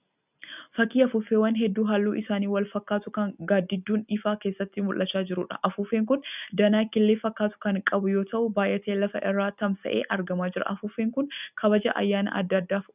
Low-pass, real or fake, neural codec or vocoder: 3.6 kHz; real; none